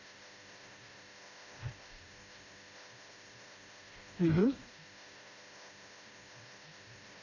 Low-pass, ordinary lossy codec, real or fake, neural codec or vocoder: 7.2 kHz; AAC, 32 kbps; fake; codec, 16 kHz, 1 kbps, FreqCodec, smaller model